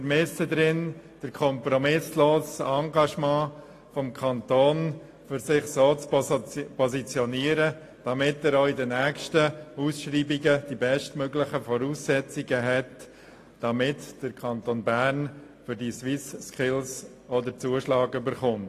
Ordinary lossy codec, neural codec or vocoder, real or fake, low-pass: AAC, 48 kbps; none; real; 14.4 kHz